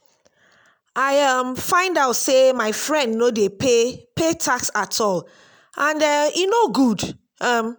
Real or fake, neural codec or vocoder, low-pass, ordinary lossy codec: real; none; none; none